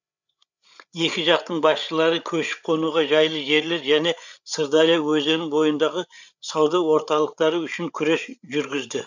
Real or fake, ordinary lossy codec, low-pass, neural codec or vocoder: fake; none; 7.2 kHz; codec, 16 kHz, 8 kbps, FreqCodec, larger model